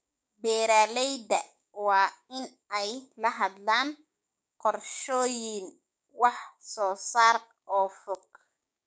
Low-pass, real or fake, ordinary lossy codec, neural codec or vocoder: none; fake; none; codec, 16 kHz, 6 kbps, DAC